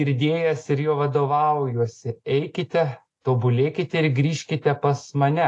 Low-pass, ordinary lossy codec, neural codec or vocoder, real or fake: 10.8 kHz; AAC, 48 kbps; none; real